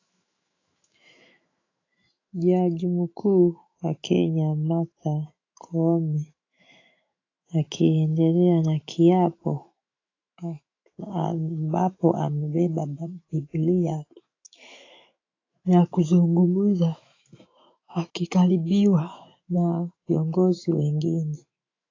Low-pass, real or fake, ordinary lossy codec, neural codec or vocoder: 7.2 kHz; fake; AAC, 32 kbps; autoencoder, 48 kHz, 128 numbers a frame, DAC-VAE, trained on Japanese speech